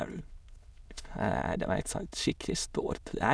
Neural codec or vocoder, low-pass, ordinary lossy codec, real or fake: autoencoder, 22.05 kHz, a latent of 192 numbers a frame, VITS, trained on many speakers; none; none; fake